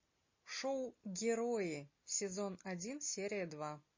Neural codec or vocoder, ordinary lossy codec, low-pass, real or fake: none; MP3, 32 kbps; 7.2 kHz; real